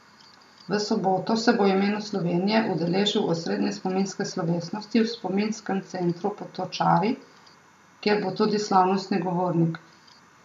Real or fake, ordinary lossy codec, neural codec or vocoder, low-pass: real; AAC, 96 kbps; none; 14.4 kHz